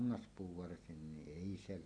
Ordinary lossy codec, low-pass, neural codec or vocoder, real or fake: none; 9.9 kHz; none; real